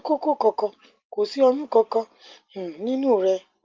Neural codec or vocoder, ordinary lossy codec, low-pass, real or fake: none; Opus, 32 kbps; 7.2 kHz; real